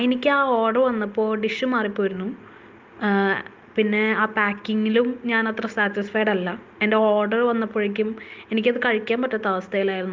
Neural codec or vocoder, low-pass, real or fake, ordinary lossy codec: none; 7.2 kHz; real; Opus, 24 kbps